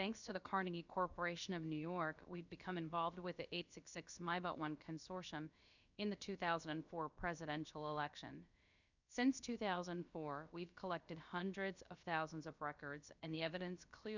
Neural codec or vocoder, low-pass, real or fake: codec, 16 kHz, about 1 kbps, DyCAST, with the encoder's durations; 7.2 kHz; fake